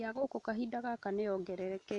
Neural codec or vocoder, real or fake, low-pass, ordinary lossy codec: none; real; 10.8 kHz; none